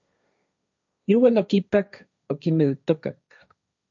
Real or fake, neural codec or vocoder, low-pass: fake; codec, 16 kHz, 1.1 kbps, Voila-Tokenizer; 7.2 kHz